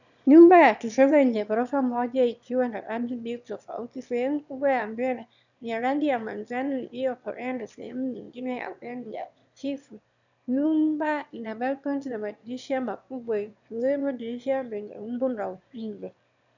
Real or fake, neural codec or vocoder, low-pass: fake; autoencoder, 22.05 kHz, a latent of 192 numbers a frame, VITS, trained on one speaker; 7.2 kHz